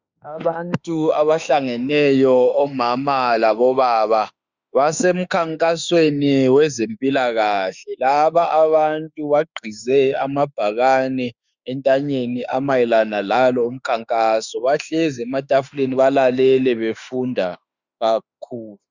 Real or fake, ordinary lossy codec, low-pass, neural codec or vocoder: fake; Opus, 64 kbps; 7.2 kHz; autoencoder, 48 kHz, 32 numbers a frame, DAC-VAE, trained on Japanese speech